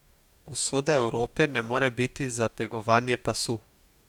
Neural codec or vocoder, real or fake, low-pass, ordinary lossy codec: codec, 44.1 kHz, 2.6 kbps, DAC; fake; 19.8 kHz; none